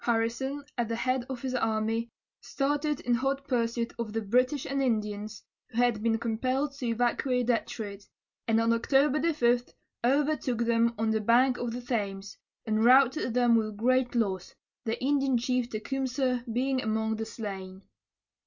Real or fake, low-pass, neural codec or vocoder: real; 7.2 kHz; none